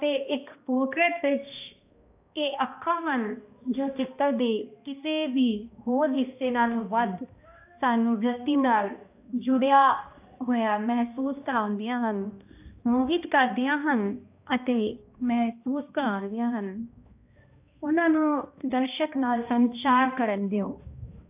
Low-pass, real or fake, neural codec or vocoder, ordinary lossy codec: 3.6 kHz; fake; codec, 16 kHz, 1 kbps, X-Codec, HuBERT features, trained on balanced general audio; AAC, 32 kbps